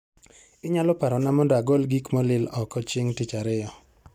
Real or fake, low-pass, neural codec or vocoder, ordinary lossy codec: real; 19.8 kHz; none; none